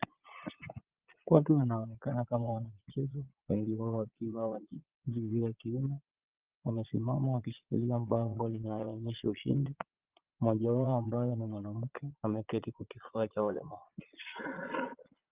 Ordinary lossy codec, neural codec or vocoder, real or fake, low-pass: Opus, 32 kbps; vocoder, 22.05 kHz, 80 mel bands, Vocos; fake; 3.6 kHz